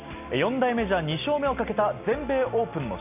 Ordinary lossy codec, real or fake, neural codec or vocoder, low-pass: none; real; none; 3.6 kHz